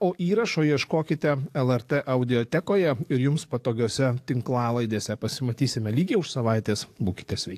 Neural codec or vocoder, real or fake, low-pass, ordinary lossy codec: codec, 44.1 kHz, 7.8 kbps, DAC; fake; 14.4 kHz; AAC, 64 kbps